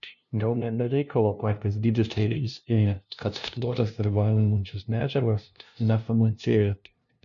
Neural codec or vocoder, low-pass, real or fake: codec, 16 kHz, 0.5 kbps, FunCodec, trained on LibriTTS, 25 frames a second; 7.2 kHz; fake